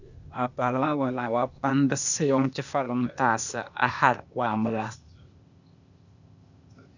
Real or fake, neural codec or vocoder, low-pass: fake; codec, 16 kHz, 0.8 kbps, ZipCodec; 7.2 kHz